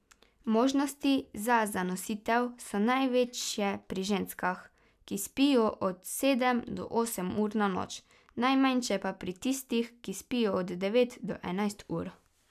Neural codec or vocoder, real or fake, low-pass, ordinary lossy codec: none; real; 14.4 kHz; none